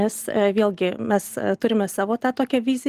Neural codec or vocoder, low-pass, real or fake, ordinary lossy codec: none; 14.4 kHz; real; Opus, 24 kbps